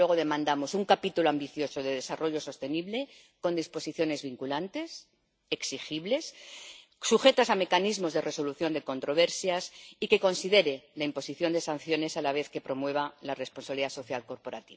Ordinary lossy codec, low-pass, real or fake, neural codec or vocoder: none; none; real; none